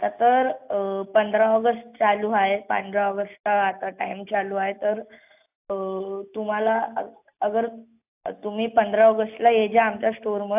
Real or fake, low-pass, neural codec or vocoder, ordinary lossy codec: real; 3.6 kHz; none; AAC, 32 kbps